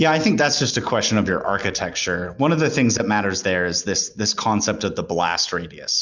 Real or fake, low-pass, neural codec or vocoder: real; 7.2 kHz; none